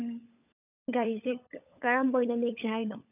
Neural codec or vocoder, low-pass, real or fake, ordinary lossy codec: codec, 16 kHz, 16 kbps, FunCodec, trained on LibriTTS, 50 frames a second; 3.6 kHz; fake; none